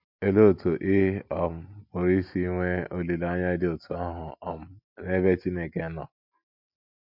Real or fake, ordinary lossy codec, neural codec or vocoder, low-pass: real; MP3, 48 kbps; none; 5.4 kHz